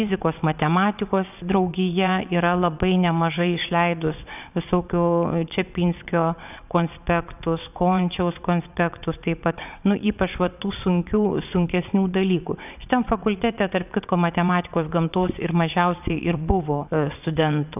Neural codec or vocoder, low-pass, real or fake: vocoder, 22.05 kHz, 80 mel bands, WaveNeXt; 3.6 kHz; fake